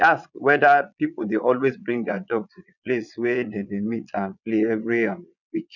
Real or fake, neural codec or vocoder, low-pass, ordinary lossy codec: fake; vocoder, 22.05 kHz, 80 mel bands, Vocos; 7.2 kHz; none